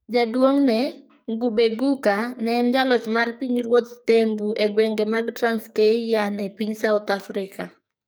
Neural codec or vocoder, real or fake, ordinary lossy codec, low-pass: codec, 44.1 kHz, 2.6 kbps, SNAC; fake; none; none